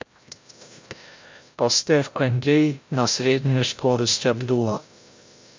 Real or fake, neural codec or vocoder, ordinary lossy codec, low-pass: fake; codec, 16 kHz, 0.5 kbps, FreqCodec, larger model; MP3, 48 kbps; 7.2 kHz